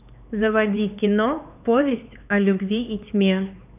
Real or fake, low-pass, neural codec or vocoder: fake; 3.6 kHz; codec, 16 kHz, 4 kbps, X-Codec, HuBERT features, trained on LibriSpeech